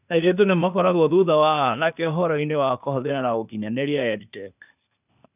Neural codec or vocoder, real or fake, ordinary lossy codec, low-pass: codec, 16 kHz, 0.8 kbps, ZipCodec; fake; none; 3.6 kHz